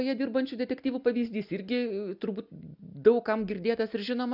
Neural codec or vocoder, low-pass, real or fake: none; 5.4 kHz; real